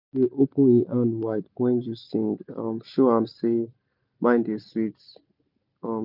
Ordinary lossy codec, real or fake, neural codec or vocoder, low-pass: none; real; none; 5.4 kHz